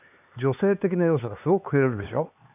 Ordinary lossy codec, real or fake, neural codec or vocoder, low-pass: none; fake; codec, 16 kHz, 4 kbps, X-Codec, HuBERT features, trained on LibriSpeech; 3.6 kHz